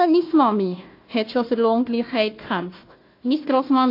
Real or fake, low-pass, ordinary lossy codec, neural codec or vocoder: fake; 5.4 kHz; AAC, 32 kbps; codec, 16 kHz, 1 kbps, FunCodec, trained on Chinese and English, 50 frames a second